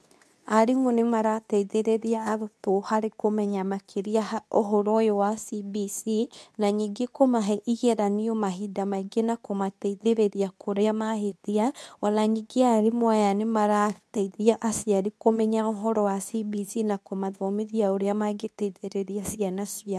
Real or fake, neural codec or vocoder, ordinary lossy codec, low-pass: fake; codec, 24 kHz, 0.9 kbps, WavTokenizer, medium speech release version 2; none; none